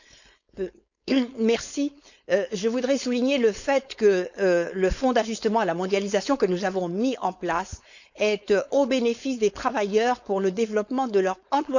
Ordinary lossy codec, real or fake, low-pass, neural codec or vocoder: none; fake; 7.2 kHz; codec, 16 kHz, 4.8 kbps, FACodec